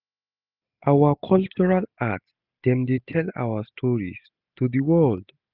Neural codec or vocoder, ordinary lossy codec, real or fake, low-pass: none; none; real; 5.4 kHz